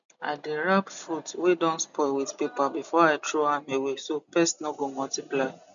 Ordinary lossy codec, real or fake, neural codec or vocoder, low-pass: none; real; none; 7.2 kHz